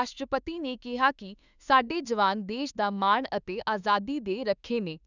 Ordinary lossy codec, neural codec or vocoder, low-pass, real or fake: none; autoencoder, 48 kHz, 32 numbers a frame, DAC-VAE, trained on Japanese speech; 7.2 kHz; fake